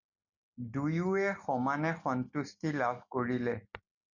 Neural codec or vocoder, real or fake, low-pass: none; real; 7.2 kHz